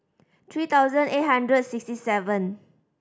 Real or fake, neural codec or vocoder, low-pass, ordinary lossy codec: real; none; none; none